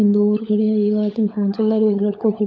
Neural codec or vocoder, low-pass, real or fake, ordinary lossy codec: codec, 16 kHz, 16 kbps, FunCodec, trained on LibriTTS, 50 frames a second; none; fake; none